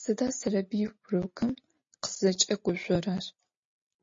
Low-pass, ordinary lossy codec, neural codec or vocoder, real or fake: 7.2 kHz; MP3, 32 kbps; none; real